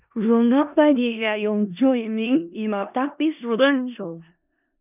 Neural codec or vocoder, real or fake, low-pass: codec, 16 kHz in and 24 kHz out, 0.4 kbps, LongCat-Audio-Codec, four codebook decoder; fake; 3.6 kHz